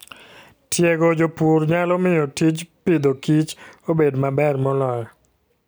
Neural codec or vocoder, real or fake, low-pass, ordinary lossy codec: none; real; none; none